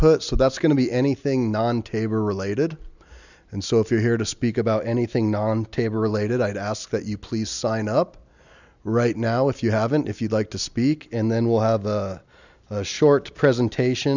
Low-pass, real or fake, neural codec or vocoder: 7.2 kHz; real; none